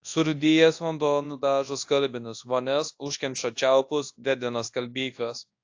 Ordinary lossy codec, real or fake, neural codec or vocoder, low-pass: AAC, 48 kbps; fake; codec, 24 kHz, 0.9 kbps, WavTokenizer, large speech release; 7.2 kHz